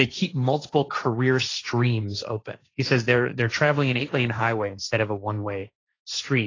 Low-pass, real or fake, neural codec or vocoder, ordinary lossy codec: 7.2 kHz; fake; codec, 16 kHz, 1.1 kbps, Voila-Tokenizer; AAC, 32 kbps